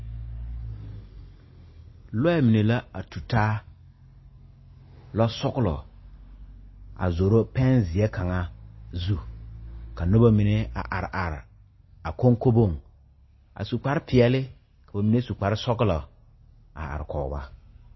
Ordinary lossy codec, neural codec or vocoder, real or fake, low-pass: MP3, 24 kbps; none; real; 7.2 kHz